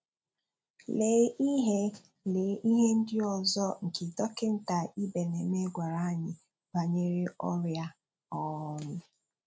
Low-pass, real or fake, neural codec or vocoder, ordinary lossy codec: none; real; none; none